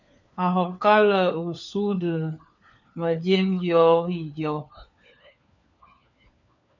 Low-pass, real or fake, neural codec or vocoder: 7.2 kHz; fake; codec, 16 kHz, 4 kbps, FunCodec, trained on LibriTTS, 50 frames a second